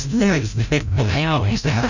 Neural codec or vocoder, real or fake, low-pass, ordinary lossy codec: codec, 16 kHz, 0.5 kbps, FreqCodec, larger model; fake; 7.2 kHz; none